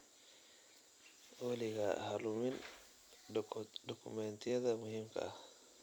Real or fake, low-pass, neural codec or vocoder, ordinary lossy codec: fake; none; vocoder, 44.1 kHz, 128 mel bands every 256 samples, BigVGAN v2; none